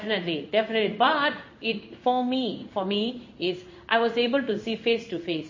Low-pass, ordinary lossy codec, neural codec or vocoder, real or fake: 7.2 kHz; MP3, 32 kbps; codec, 16 kHz in and 24 kHz out, 1 kbps, XY-Tokenizer; fake